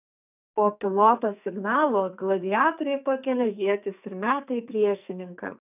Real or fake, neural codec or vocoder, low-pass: fake; codec, 44.1 kHz, 2.6 kbps, SNAC; 3.6 kHz